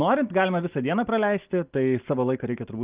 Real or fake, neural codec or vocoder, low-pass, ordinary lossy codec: real; none; 3.6 kHz; Opus, 32 kbps